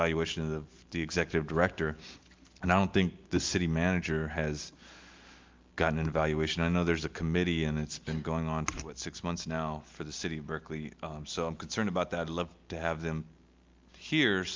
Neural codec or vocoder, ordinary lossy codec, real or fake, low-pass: none; Opus, 24 kbps; real; 7.2 kHz